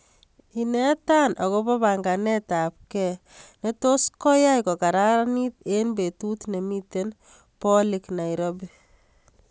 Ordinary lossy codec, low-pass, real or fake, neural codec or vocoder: none; none; real; none